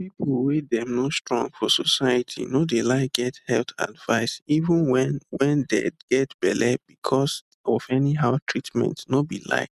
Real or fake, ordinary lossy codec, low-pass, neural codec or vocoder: real; none; 14.4 kHz; none